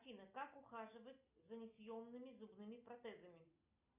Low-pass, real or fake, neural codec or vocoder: 3.6 kHz; real; none